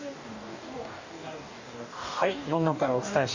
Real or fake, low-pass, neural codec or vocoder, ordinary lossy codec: fake; 7.2 kHz; codec, 44.1 kHz, 2.6 kbps, DAC; none